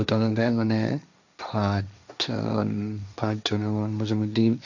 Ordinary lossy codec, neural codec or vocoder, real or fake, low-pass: none; codec, 16 kHz, 1.1 kbps, Voila-Tokenizer; fake; 7.2 kHz